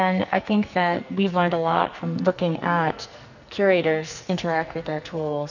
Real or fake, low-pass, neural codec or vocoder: fake; 7.2 kHz; codec, 44.1 kHz, 2.6 kbps, SNAC